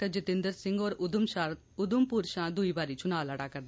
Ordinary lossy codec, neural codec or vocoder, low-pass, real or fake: none; none; none; real